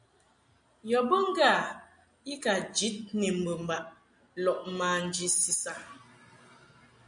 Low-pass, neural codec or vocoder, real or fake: 9.9 kHz; none; real